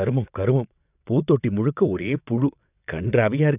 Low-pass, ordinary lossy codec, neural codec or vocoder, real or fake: 3.6 kHz; none; vocoder, 22.05 kHz, 80 mel bands, WaveNeXt; fake